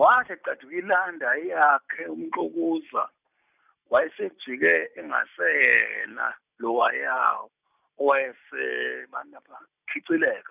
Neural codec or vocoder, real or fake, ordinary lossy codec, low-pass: none; real; none; 3.6 kHz